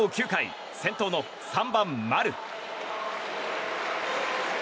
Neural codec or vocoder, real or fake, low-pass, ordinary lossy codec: none; real; none; none